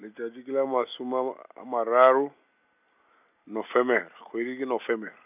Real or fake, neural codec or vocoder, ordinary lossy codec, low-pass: real; none; none; 3.6 kHz